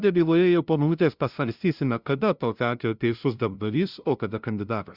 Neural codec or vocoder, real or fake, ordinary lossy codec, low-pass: codec, 16 kHz, 0.5 kbps, FunCodec, trained on LibriTTS, 25 frames a second; fake; Opus, 64 kbps; 5.4 kHz